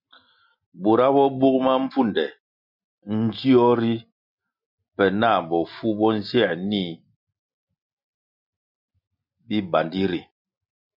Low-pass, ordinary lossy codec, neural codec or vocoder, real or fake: 5.4 kHz; MP3, 48 kbps; none; real